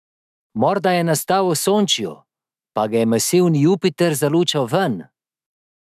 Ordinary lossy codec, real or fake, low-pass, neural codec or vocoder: none; fake; 14.4 kHz; autoencoder, 48 kHz, 128 numbers a frame, DAC-VAE, trained on Japanese speech